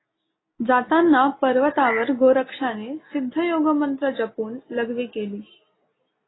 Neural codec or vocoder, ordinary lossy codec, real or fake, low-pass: none; AAC, 16 kbps; real; 7.2 kHz